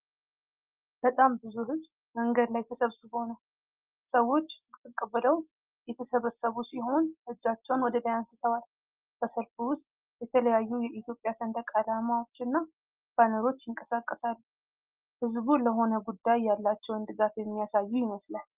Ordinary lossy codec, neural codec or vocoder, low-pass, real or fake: Opus, 24 kbps; none; 3.6 kHz; real